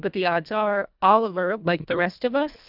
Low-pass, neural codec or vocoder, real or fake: 5.4 kHz; codec, 24 kHz, 1.5 kbps, HILCodec; fake